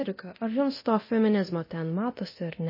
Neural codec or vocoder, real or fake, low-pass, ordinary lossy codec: codec, 24 kHz, 0.9 kbps, DualCodec; fake; 5.4 kHz; MP3, 24 kbps